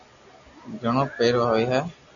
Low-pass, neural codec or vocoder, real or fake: 7.2 kHz; none; real